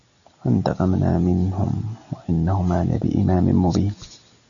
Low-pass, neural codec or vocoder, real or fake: 7.2 kHz; none; real